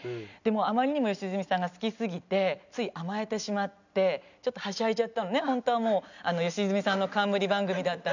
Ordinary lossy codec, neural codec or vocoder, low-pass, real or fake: none; none; 7.2 kHz; real